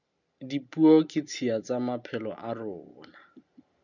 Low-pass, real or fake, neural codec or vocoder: 7.2 kHz; real; none